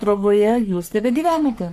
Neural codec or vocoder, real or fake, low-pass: codec, 44.1 kHz, 3.4 kbps, Pupu-Codec; fake; 14.4 kHz